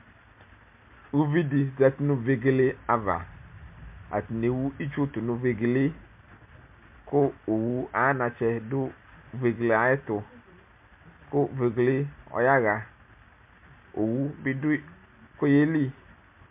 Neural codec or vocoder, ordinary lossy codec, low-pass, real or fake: none; MP3, 32 kbps; 3.6 kHz; real